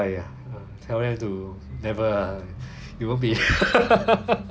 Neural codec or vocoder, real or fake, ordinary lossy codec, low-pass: none; real; none; none